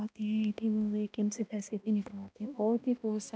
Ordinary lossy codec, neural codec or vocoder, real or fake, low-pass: none; codec, 16 kHz, 1 kbps, X-Codec, HuBERT features, trained on balanced general audio; fake; none